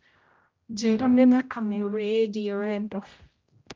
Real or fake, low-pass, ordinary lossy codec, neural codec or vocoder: fake; 7.2 kHz; Opus, 24 kbps; codec, 16 kHz, 0.5 kbps, X-Codec, HuBERT features, trained on general audio